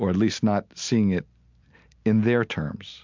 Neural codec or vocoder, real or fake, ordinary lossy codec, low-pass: none; real; MP3, 64 kbps; 7.2 kHz